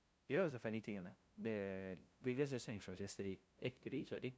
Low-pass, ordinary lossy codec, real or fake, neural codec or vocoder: none; none; fake; codec, 16 kHz, 0.5 kbps, FunCodec, trained on LibriTTS, 25 frames a second